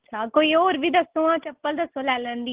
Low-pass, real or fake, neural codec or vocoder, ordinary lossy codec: 3.6 kHz; real; none; Opus, 16 kbps